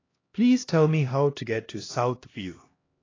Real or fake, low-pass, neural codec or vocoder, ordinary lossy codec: fake; 7.2 kHz; codec, 16 kHz, 1 kbps, X-Codec, HuBERT features, trained on LibriSpeech; AAC, 32 kbps